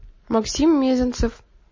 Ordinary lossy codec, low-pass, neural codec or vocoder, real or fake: MP3, 32 kbps; 7.2 kHz; none; real